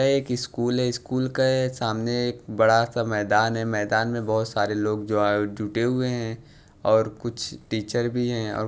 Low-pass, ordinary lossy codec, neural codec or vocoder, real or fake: none; none; none; real